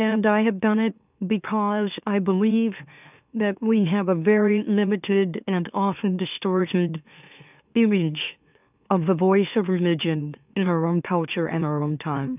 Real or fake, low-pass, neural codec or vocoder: fake; 3.6 kHz; autoencoder, 44.1 kHz, a latent of 192 numbers a frame, MeloTTS